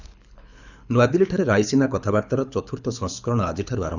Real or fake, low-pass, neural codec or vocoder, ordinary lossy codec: fake; 7.2 kHz; codec, 24 kHz, 6 kbps, HILCodec; none